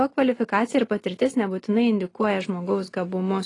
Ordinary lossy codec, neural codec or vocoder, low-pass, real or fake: AAC, 32 kbps; none; 10.8 kHz; real